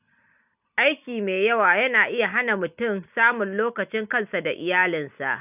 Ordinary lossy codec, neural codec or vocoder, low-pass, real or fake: none; none; 3.6 kHz; real